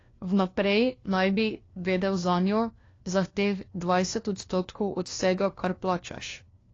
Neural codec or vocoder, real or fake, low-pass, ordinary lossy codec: codec, 16 kHz, 1 kbps, FunCodec, trained on LibriTTS, 50 frames a second; fake; 7.2 kHz; AAC, 32 kbps